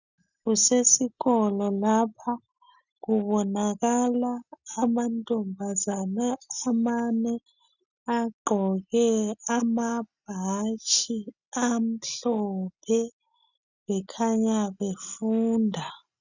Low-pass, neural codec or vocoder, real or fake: 7.2 kHz; none; real